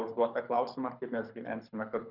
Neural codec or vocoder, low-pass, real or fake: codec, 24 kHz, 6 kbps, HILCodec; 5.4 kHz; fake